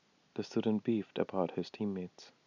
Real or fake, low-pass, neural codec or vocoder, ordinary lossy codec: real; 7.2 kHz; none; none